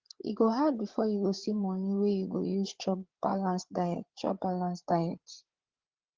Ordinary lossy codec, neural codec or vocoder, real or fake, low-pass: Opus, 16 kbps; codec, 16 kHz, 8 kbps, FreqCodec, larger model; fake; 7.2 kHz